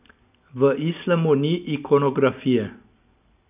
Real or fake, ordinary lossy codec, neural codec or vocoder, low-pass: real; none; none; 3.6 kHz